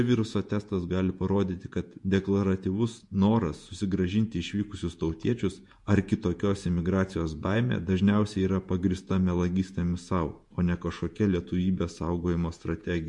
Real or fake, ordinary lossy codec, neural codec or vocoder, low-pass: fake; MP3, 64 kbps; vocoder, 48 kHz, 128 mel bands, Vocos; 10.8 kHz